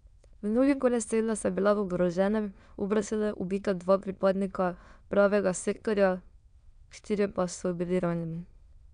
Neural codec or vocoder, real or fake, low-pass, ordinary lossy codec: autoencoder, 22.05 kHz, a latent of 192 numbers a frame, VITS, trained on many speakers; fake; 9.9 kHz; none